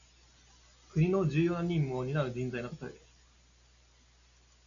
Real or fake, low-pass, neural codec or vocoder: real; 7.2 kHz; none